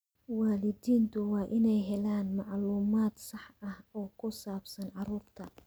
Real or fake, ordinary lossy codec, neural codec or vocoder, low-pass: real; none; none; none